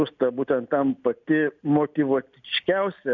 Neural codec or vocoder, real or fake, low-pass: none; real; 7.2 kHz